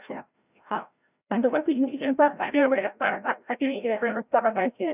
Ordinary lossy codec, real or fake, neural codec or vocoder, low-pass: none; fake; codec, 16 kHz, 0.5 kbps, FreqCodec, larger model; 3.6 kHz